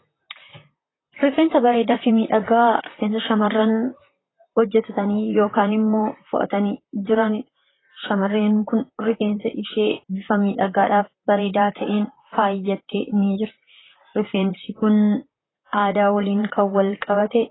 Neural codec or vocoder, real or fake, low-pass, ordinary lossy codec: vocoder, 44.1 kHz, 128 mel bands, Pupu-Vocoder; fake; 7.2 kHz; AAC, 16 kbps